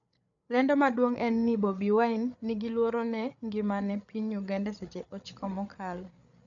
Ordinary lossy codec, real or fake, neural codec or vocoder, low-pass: none; fake; codec, 16 kHz, 8 kbps, FreqCodec, larger model; 7.2 kHz